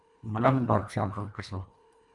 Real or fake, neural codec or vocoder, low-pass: fake; codec, 24 kHz, 1.5 kbps, HILCodec; 10.8 kHz